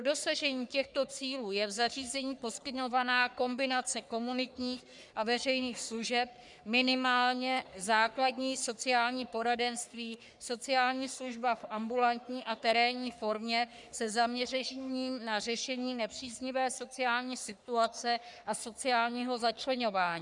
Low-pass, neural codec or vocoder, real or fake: 10.8 kHz; codec, 44.1 kHz, 3.4 kbps, Pupu-Codec; fake